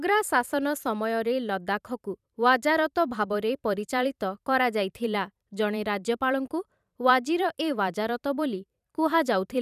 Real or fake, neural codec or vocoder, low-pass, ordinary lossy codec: fake; vocoder, 44.1 kHz, 128 mel bands every 512 samples, BigVGAN v2; 14.4 kHz; none